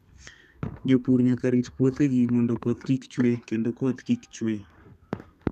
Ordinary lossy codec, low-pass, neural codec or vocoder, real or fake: none; 14.4 kHz; codec, 32 kHz, 1.9 kbps, SNAC; fake